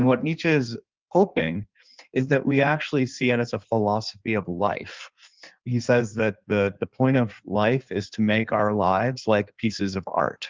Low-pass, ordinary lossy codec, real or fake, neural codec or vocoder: 7.2 kHz; Opus, 24 kbps; fake; codec, 16 kHz in and 24 kHz out, 1.1 kbps, FireRedTTS-2 codec